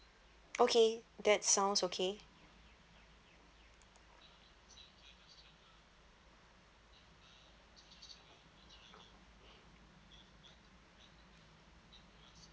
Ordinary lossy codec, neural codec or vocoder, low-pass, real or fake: none; none; none; real